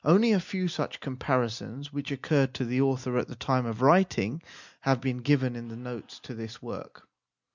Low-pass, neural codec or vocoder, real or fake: 7.2 kHz; none; real